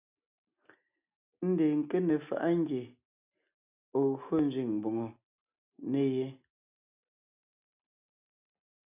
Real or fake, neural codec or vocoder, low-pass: real; none; 3.6 kHz